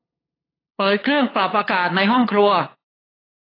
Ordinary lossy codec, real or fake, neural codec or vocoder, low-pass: AAC, 24 kbps; fake; codec, 16 kHz, 8 kbps, FunCodec, trained on LibriTTS, 25 frames a second; 5.4 kHz